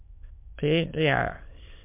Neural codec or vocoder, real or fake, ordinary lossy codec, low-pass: autoencoder, 22.05 kHz, a latent of 192 numbers a frame, VITS, trained on many speakers; fake; MP3, 32 kbps; 3.6 kHz